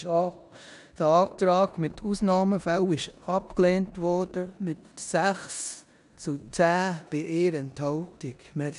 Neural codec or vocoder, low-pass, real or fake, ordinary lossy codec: codec, 16 kHz in and 24 kHz out, 0.9 kbps, LongCat-Audio-Codec, four codebook decoder; 10.8 kHz; fake; none